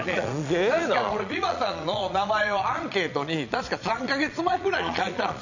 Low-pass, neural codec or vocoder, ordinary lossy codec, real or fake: 7.2 kHz; vocoder, 22.05 kHz, 80 mel bands, Vocos; none; fake